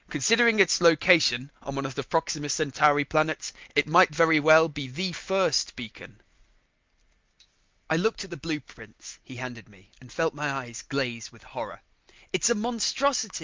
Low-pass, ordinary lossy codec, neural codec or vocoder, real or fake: 7.2 kHz; Opus, 24 kbps; none; real